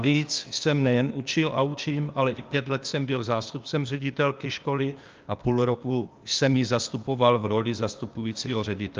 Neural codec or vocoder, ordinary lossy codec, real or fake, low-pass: codec, 16 kHz, 0.8 kbps, ZipCodec; Opus, 24 kbps; fake; 7.2 kHz